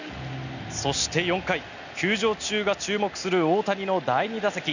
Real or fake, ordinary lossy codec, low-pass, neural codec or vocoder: real; none; 7.2 kHz; none